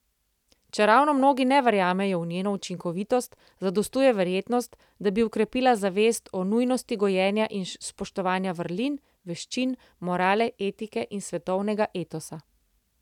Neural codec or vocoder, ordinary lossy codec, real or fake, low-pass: none; none; real; 19.8 kHz